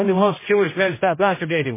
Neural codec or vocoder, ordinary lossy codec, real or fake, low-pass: codec, 16 kHz, 0.5 kbps, X-Codec, HuBERT features, trained on general audio; MP3, 16 kbps; fake; 3.6 kHz